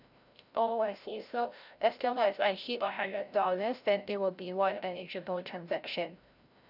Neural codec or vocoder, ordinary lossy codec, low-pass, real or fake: codec, 16 kHz, 0.5 kbps, FreqCodec, larger model; none; 5.4 kHz; fake